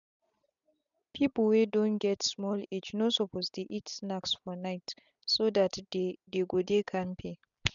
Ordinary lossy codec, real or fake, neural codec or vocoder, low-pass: none; real; none; 7.2 kHz